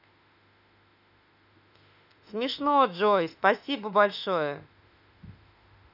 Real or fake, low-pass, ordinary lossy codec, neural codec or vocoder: fake; 5.4 kHz; none; autoencoder, 48 kHz, 32 numbers a frame, DAC-VAE, trained on Japanese speech